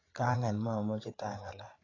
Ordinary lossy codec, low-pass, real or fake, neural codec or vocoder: AAC, 32 kbps; 7.2 kHz; fake; codec, 16 kHz, 8 kbps, FreqCodec, larger model